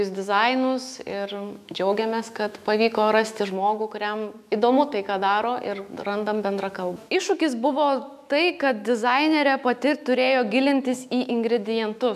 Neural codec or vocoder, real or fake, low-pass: autoencoder, 48 kHz, 128 numbers a frame, DAC-VAE, trained on Japanese speech; fake; 14.4 kHz